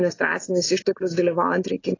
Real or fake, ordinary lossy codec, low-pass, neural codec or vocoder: real; AAC, 32 kbps; 7.2 kHz; none